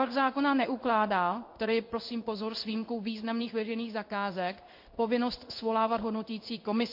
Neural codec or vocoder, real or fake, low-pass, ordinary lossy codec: codec, 16 kHz in and 24 kHz out, 1 kbps, XY-Tokenizer; fake; 5.4 kHz; MP3, 32 kbps